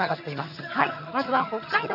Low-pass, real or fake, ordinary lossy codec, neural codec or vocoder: 5.4 kHz; fake; none; vocoder, 22.05 kHz, 80 mel bands, HiFi-GAN